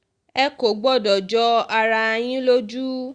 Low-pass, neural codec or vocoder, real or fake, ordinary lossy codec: 9.9 kHz; none; real; Opus, 64 kbps